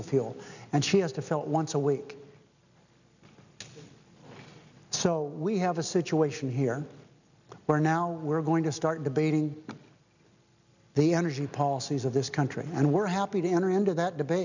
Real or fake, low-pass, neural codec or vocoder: real; 7.2 kHz; none